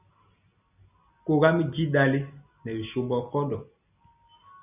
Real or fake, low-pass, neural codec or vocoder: real; 3.6 kHz; none